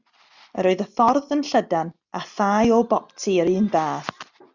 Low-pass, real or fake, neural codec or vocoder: 7.2 kHz; real; none